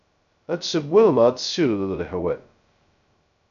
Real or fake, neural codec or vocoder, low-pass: fake; codec, 16 kHz, 0.2 kbps, FocalCodec; 7.2 kHz